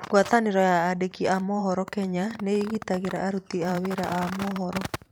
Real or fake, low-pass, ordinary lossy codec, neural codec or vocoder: fake; none; none; vocoder, 44.1 kHz, 128 mel bands every 512 samples, BigVGAN v2